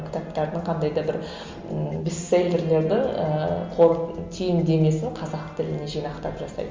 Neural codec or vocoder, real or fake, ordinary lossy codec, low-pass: none; real; Opus, 32 kbps; 7.2 kHz